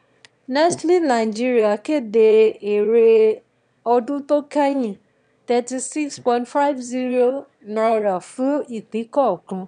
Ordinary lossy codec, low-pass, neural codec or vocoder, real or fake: none; 9.9 kHz; autoencoder, 22.05 kHz, a latent of 192 numbers a frame, VITS, trained on one speaker; fake